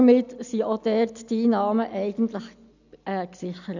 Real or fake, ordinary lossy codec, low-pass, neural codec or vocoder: real; none; 7.2 kHz; none